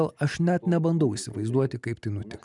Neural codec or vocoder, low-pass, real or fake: none; 10.8 kHz; real